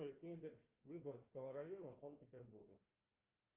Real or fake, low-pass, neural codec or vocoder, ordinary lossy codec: fake; 3.6 kHz; codec, 16 kHz, 1 kbps, FunCodec, trained on Chinese and English, 50 frames a second; Opus, 24 kbps